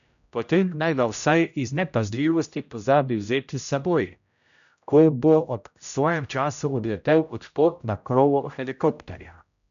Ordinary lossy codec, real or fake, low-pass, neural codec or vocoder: none; fake; 7.2 kHz; codec, 16 kHz, 0.5 kbps, X-Codec, HuBERT features, trained on general audio